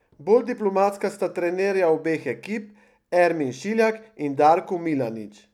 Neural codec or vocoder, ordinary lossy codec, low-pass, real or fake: none; none; 19.8 kHz; real